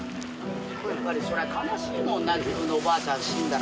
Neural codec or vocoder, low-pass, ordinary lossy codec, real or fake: none; none; none; real